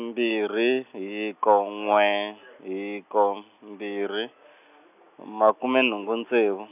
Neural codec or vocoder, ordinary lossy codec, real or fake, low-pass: none; none; real; 3.6 kHz